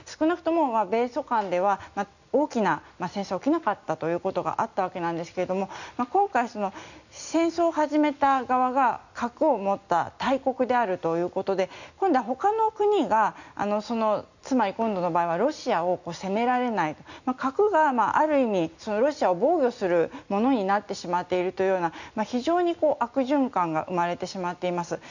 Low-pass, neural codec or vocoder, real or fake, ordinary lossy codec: 7.2 kHz; none; real; none